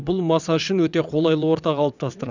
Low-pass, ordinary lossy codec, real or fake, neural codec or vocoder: 7.2 kHz; none; fake; vocoder, 22.05 kHz, 80 mel bands, WaveNeXt